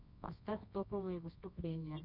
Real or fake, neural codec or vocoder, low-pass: fake; codec, 24 kHz, 0.9 kbps, WavTokenizer, medium music audio release; 5.4 kHz